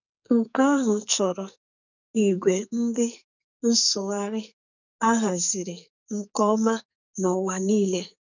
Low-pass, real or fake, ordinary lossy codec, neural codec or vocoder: 7.2 kHz; fake; none; codec, 44.1 kHz, 2.6 kbps, SNAC